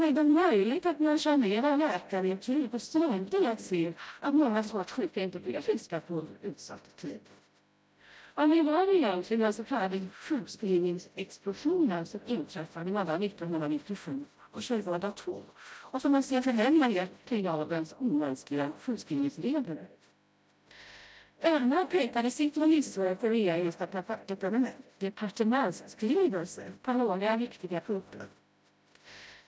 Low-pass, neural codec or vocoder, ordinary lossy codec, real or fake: none; codec, 16 kHz, 0.5 kbps, FreqCodec, smaller model; none; fake